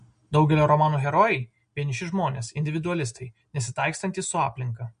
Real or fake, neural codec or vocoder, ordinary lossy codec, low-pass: real; none; MP3, 48 kbps; 9.9 kHz